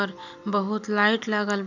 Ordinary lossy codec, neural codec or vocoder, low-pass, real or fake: none; none; 7.2 kHz; real